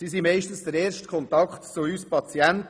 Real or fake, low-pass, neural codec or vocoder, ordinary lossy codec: real; none; none; none